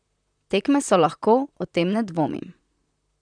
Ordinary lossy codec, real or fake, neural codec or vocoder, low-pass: none; fake; vocoder, 44.1 kHz, 128 mel bands, Pupu-Vocoder; 9.9 kHz